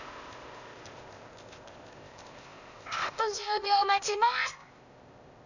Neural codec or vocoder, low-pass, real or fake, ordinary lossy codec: codec, 16 kHz, 0.8 kbps, ZipCodec; 7.2 kHz; fake; none